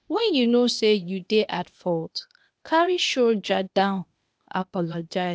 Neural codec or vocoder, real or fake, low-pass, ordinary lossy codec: codec, 16 kHz, 0.8 kbps, ZipCodec; fake; none; none